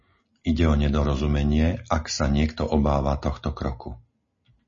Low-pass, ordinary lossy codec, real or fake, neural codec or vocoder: 7.2 kHz; MP3, 32 kbps; real; none